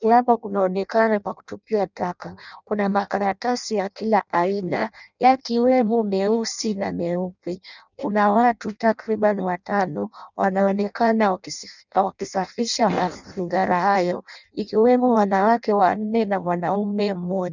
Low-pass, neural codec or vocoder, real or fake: 7.2 kHz; codec, 16 kHz in and 24 kHz out, 0.6 kbps, FireRedTTS-2 codec; fake